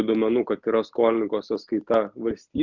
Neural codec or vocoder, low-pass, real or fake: none; 7.2 kHz; real